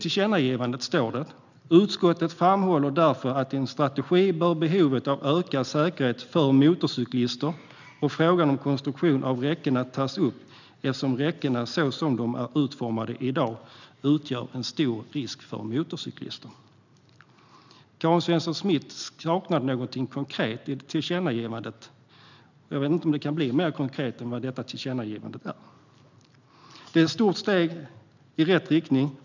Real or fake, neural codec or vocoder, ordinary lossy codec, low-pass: real; none; none; 7.2 kHz